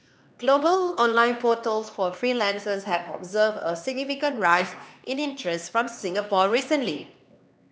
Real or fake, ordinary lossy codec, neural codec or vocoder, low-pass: fake; none; codec, 16 kHz, 2 kbps, X-Codec, HuBERT features, trained on LibriSpeech; none